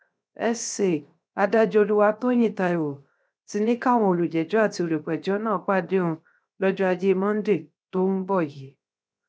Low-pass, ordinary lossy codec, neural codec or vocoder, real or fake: none; none; codec, 16 kHz, 0.7 kbps, FocalCodec; fake